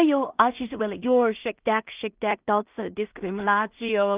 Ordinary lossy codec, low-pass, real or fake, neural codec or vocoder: Opus, 24 kbps; 3.6 kHz; fake; codec, 16 kHz in and 24 kHz out, 0.4 kbps, LongCat-Audio-Codec, two codebook decoder